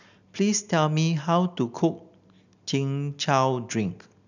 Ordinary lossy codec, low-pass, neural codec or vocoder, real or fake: none; 7.2 kHz; none; real